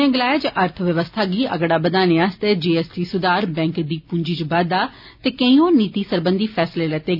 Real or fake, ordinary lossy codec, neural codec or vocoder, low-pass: real; none; none; 5.4 kHz